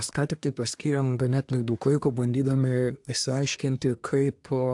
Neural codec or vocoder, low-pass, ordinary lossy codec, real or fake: codec, 24 kHz, 1 kbps, SNAC; 10.8 kHz; AAC, 64 kbps; fake